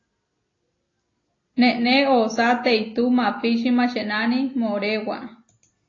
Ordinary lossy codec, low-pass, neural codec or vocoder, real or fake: AAC, 32 kbps; 7.2 kHz; none; real